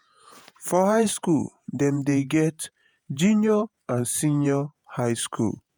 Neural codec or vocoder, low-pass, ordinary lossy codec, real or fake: vocoder, 48 kHz, 128 mel bands, Vocos; none; none; fake